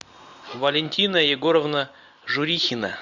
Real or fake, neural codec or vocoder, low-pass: real; none; 7.2 kHz